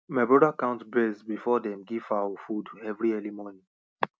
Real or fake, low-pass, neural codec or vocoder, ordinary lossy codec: real; none; none; none